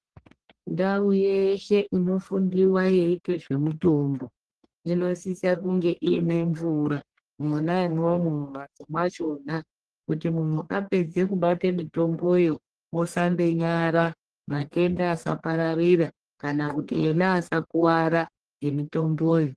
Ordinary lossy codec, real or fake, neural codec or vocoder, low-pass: Opus, 16 kbps; fake; codec, 44.1 kHz, 1.7 kbps, Pupu-Codec; 10.8 kHz